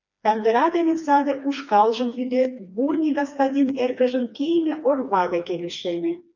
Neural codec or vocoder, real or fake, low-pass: codec, 16 kHz, 2 kbps, FreqCodec, smaller model; fake; 7.2 kHz